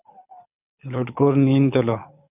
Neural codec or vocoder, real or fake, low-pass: codec, 24 kHz, 6 kbps, HILCodec; fake; 3.6 kHz